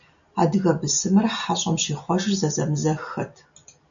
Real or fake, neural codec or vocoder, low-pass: real; none; 7.2 kHz